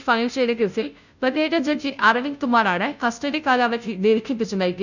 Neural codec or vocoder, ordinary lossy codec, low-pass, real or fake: codec, 16 kHz, 0.5 kbps, FunCodec, trained on Chinese and English, 25 frames a second; none; 7.2 kHz; fake